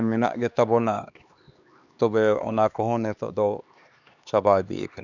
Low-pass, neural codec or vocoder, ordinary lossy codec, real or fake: 7.2 kHz; codec, 16 kHz, 2 kbps, X-Codec, HuBERT features, trained on LibriSpeech; none; fake